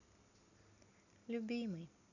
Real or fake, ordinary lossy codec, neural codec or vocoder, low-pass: real; none; none; 7.2 kHz